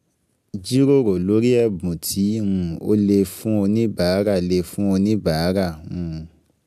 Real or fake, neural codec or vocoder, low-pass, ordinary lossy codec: real; none; 14.4 kHz; none